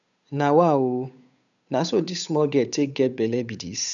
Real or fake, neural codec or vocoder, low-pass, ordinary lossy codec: fake; codec, 16 kHz, 8 kbps, FunCodec, trained on Chinese and English, 25 frames a second; 7.2 kHz; none